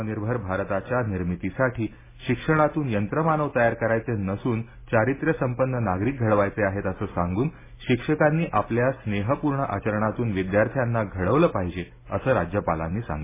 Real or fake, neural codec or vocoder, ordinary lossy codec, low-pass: real; none; MP3, 16 kbps; 3.6 kHz